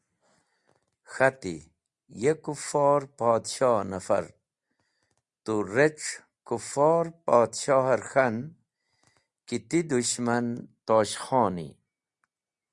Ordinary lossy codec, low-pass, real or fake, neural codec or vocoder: Opus, 64 kbps; 10.8 kHz; real; none